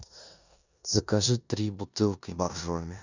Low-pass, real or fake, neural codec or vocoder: 7.2 kHz; fake; codec, 16 kHz in and 24 kHz out, 0.9 kbps, LongCat-Audio-Codec, four codebook decoder